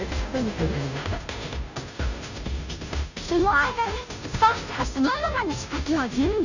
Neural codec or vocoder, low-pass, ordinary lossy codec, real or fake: codec, 16 kHz, 0.5 kbps, FunCodec, trained on Chinese and English, 25 frames a second; 7.2 kHz; none; fake